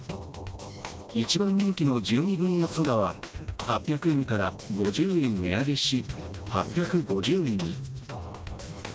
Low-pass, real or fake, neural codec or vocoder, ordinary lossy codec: none; fake; codec, 16 kHz, 1 kbps, FreqCodec, smaller model; none